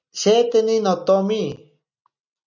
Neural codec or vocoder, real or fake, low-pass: none; real; 7.2 kHz